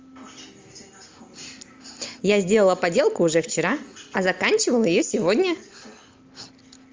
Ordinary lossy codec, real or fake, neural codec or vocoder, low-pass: Opus, 32 kbps; real; none; 7.2 kHz